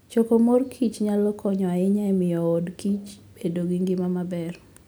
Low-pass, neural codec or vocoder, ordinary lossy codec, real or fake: none; none; none; real